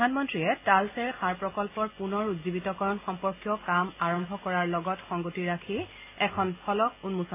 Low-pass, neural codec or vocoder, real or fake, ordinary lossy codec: 3.6 kHz; none; real; AAC, 24 kbps